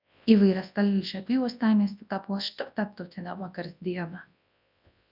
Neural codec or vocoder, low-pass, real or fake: codec, 24 kHz, 0.9 kbps, WavTokenizer, large speech release; 5.4 kHz; fake